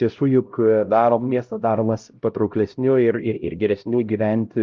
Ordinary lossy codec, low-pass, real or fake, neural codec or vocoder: Opus, 32 kbps; 7.2 kHz; fake; codec, 16 kHz, 0.5 kbps, X-Codec, HuBERT features, trained on LibriSpeech